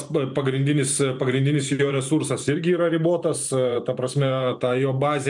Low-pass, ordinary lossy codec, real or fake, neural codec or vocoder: 10.8 kHz; MP3, 96 kbps; fake; vocoder, 44.1 kHz, 128 mel bands every 512 samples, BigVGAN v2